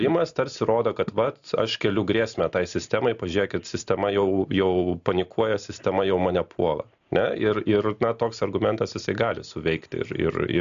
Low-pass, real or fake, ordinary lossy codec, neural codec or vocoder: 7.2 kHz; real; AAC, 96 kbps; none